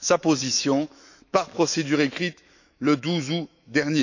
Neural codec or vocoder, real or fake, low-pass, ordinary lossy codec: autoencoder, 48 kHz, 128 numbers a frame, DAC-VAE, trained on Japanese speech; fake; 7.2 kHz; none